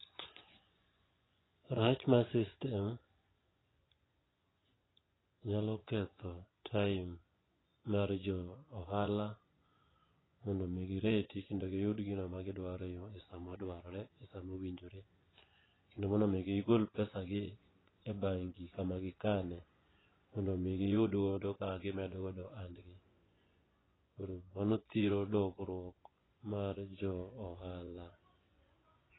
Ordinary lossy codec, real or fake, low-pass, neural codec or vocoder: AAC, 16 kbps; fake; 7.2 kHz; vocoder, 24 kHz, 100 mel bands, Vocos